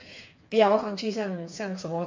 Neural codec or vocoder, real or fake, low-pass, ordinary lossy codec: codec, 16 kHz, 4 kbps, FreqCodec, smaller model; fake; 7.2 kHz; MP3, 48 kbps